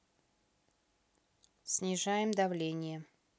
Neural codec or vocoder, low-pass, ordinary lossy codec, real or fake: none; none; none; real